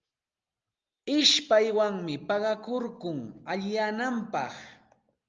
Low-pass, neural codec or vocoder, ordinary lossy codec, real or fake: 7.2 kHz; none; Opus, 24 kbps; real